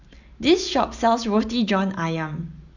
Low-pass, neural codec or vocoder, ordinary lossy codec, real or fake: 7.2 kHz; none; none; real